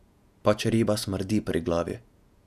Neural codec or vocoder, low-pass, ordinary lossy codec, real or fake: vocoder, 48 kHz, 128 mel bands, Vocos; 14.4 kHz; none; fake